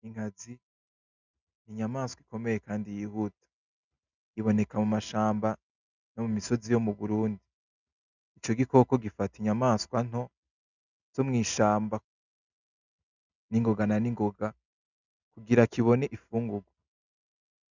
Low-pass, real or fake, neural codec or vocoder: 7.2 kHz; real; none